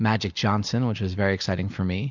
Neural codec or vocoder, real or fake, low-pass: none; real; 7.2 kHz